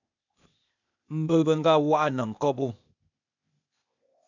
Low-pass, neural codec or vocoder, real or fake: 7.2 kHz; codec, 16 kHz, 0.8 kbps, ZipCodec; fake